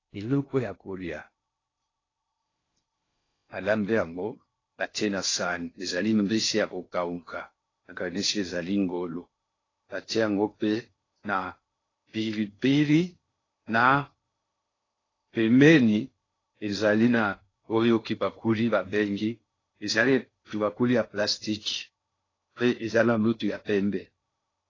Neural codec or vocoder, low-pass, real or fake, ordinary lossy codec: codec, 16 kHz in and 24 kHz out, 0.6 kbps, FocalCodec, streaming, 4096 codes; 7.2 kHz; fake; AAC, 32 kbps